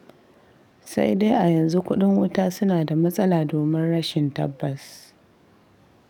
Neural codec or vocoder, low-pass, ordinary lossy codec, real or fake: codec, 44.1 kHz, 7.8 kbps, DAC; 19.8 kHz; none; fake